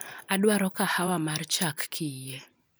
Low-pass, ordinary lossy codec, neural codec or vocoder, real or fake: none; none; vocoder, 44.1 kHz, 128 mel bands every 512 samples, BigVGAN v2; fake